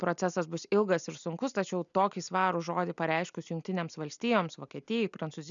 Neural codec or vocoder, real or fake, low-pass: none; real; 7.2 kHz